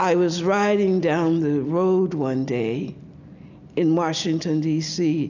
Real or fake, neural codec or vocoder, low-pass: real; none; 7.2 kHz